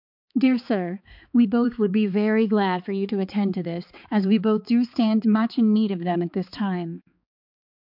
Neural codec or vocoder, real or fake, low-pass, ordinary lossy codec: codec, 16 kHz, 4 kbps, X-Codec, HuBERT features, trained on balanced general audio; fake; 5.4 kHz; MP3, 48 kbps